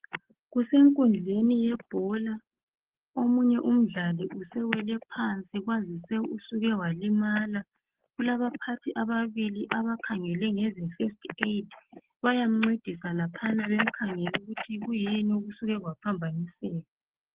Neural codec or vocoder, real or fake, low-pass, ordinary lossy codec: none; real; 3.6 kHz; Opus, 16 kbps